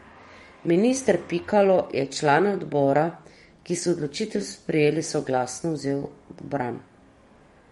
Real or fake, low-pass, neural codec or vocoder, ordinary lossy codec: fake; 19.8 kHz; codec, 44.1 kHz, 7.8 kbps, DAC; MP3, 48 kbps